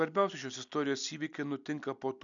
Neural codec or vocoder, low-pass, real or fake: none; 7.2 kHz; real